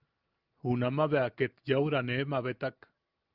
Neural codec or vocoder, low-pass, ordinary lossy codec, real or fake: none; 5.4 kHz; Opus, 32 kbps; real